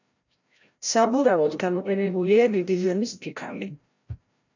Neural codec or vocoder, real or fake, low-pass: codec, 16 kHz, 0.5 kbps, FreqCodec, larger model; fake; 7.2 kHz